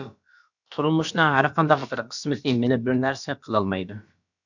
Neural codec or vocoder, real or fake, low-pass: codec, 16 kHz, about 1 kbps, DyCAST, with the encoder's durations; fake; 7.2 kHz